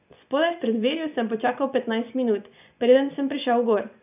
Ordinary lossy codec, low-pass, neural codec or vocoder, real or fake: none; 3.6 kHz; vocoder, 24 kHz, 100 mel bands, Vocos; fake